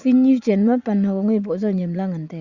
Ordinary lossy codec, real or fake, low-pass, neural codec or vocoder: Opus, 64 kbps; fake; 7.2 kHz; vocoder, 44.1 kHz, 80 mel bands, Vocos